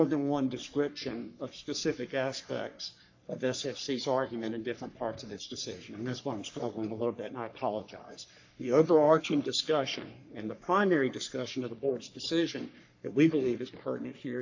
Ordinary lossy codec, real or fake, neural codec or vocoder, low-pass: AAC, 48 kbps; fake; codec, 44.1 kHz, 3.4 kbps, Pupu-Codec; 7.2 kHz